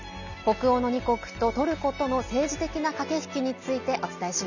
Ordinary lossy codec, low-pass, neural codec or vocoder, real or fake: none; 7.2 kHz; none; real